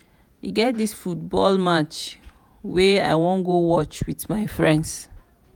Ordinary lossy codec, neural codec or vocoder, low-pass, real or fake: none; vocoder, 48 kHz, 128 mel bands, Vocos; none; fake